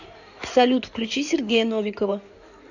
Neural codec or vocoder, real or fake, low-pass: codec, 16 kHz in and 24 kHz out, 2.2 kbps, FireRedTTS-2 codec; fake; 7.2 kHz